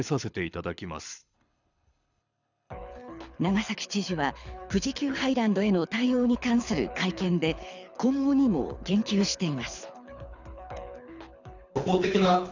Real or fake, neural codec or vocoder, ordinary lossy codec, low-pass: fake; codec, 24 kHz, 6 kbps, HILCodec; none; 7.2 kHz